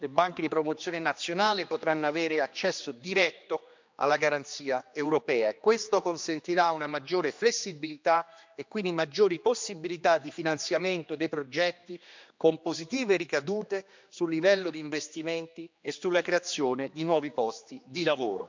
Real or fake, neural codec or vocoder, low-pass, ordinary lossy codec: fake; codec, 16 kHz, 2 kbps, X-Codec, HuBERT features, trained on general audio; 7.2 kHz; MP3, 64 kbps